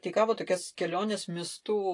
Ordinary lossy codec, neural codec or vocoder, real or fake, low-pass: AAC, 48 kbps; none; real; 10.8 kHz